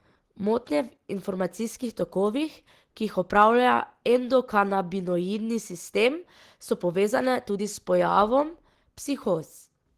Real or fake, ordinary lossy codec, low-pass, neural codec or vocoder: real; Opus, 16 kbps; 14.4 kHz; none